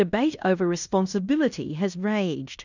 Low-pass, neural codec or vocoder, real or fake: 7.2 kHz; codec, 16 kHz in and 24 kHz out, 0.9 kbps, LongCat-Audio-Codec, fine tuned four codebook decoder; fake